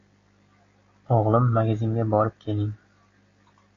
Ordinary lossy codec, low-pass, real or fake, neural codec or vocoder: AAC, 32 kbps; 7.2 kHz; real; none